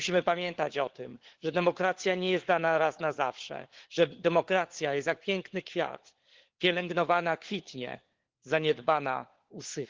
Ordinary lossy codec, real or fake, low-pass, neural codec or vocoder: Opus, 16 kbps; fake; 7.2 kHz; codec, 16 kHz, 4 kbps, FunCodec, trained on LibriTTS, 50 frames a second